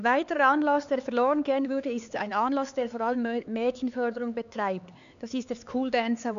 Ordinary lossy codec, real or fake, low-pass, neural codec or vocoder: none; fake; 7.2 kHz; codec, 16 kHz, 4 kbps, X-Codec, HuBERT features, trained on LibriSpeech